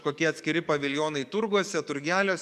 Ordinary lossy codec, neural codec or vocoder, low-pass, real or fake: MP3, 96 kbps; codec, 44.1 kHz, 7.8 kbps, DAC; 14.4 kHz; fake